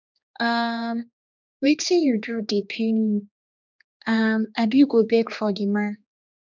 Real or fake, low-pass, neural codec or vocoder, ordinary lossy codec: fake; 7.2 kHz; codec, 16 kHz, 2 kbps, X-Codec, HuBERT features, trained on general audio; none